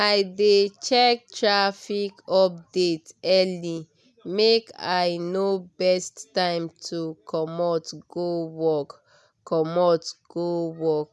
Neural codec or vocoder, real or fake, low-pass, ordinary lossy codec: none; real; none; none